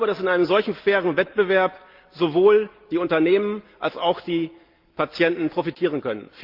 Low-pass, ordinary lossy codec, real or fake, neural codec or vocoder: 5.4 kHz; Opus, 32 kbps; real; none